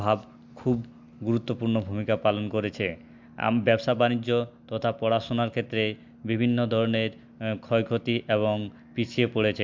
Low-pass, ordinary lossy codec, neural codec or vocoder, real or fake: 7.2 kHz; MP3, 64 kbps; none; real